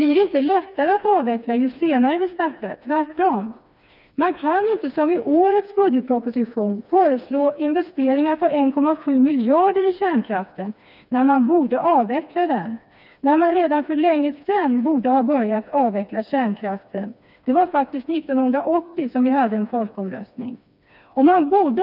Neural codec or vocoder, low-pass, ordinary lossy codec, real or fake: codec, 16 kHz, 2 kbps, FreqCodec, smaller model; 5.4 kHz; none; fake